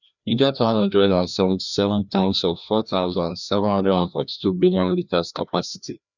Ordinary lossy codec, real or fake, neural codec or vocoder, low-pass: none; fake; codec, 16 kHz, 1 kbps, FreqCodec, larger model; 7.2 kHz